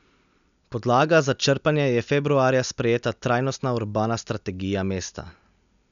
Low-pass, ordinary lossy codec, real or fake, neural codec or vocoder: 7.2 kHz; none; real; none